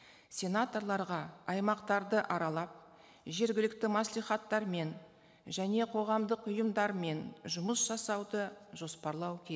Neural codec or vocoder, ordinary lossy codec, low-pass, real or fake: none; none; none; real